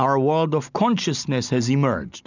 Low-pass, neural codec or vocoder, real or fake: 7.2 kHz; none; real